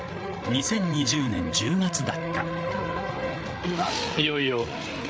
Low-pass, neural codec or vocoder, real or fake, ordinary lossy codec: none; codec, 16 kHz, 8 kbps, FreqCodec, larger model; fake; none